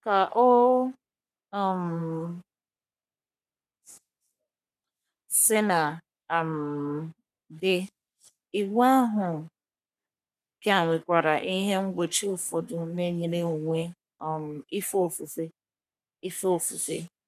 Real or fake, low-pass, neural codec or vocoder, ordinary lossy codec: fake; 14.4 kHz; codec, 44.1 kHz, 3.4 kbps, Pupu-Codec; none